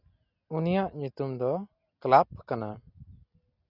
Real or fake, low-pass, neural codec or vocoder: real; 5.4 kHz; none